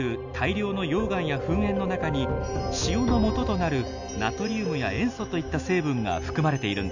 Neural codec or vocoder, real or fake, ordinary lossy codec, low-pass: none; real; none; 7.2 kHz